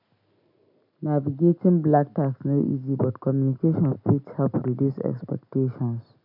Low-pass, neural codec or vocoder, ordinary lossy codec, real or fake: 5.4 kHz; none; none; real